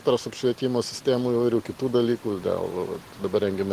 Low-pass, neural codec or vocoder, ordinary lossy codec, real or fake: 14.4 kHz; none; Opus, 16 kbps; real